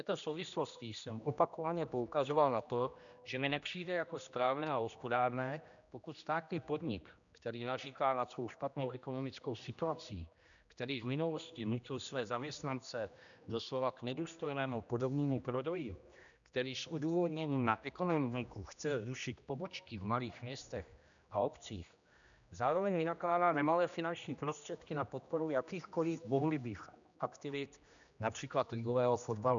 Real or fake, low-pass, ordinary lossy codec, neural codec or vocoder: fake; 7.2 kHz; MP3, 96 kbps; codec, 16 kHz, 1 kbps, X-Codec, HuBERT features, trained on general audio